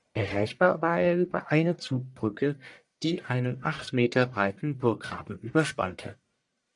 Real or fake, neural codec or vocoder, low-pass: fake; codec, 44.1 kHz, 1.7 kbps, Pupu-Codec; 10.8 kHz